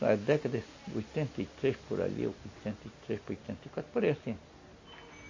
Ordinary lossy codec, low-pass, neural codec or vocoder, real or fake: MP3, 32 kbps; 7.2 kHz; none; real